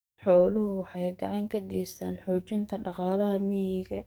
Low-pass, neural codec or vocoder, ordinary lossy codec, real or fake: none; codec, 44.1 kHz, 2.6 kbps, SNAC; none; fake